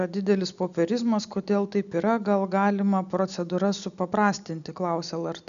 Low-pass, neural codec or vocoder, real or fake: 7.2 kHz; none; real